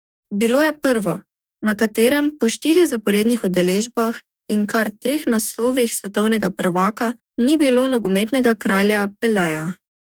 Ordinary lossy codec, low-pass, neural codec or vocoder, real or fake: none; none; codec, 44.1 kHz, 2.6 kbps, DAC; fake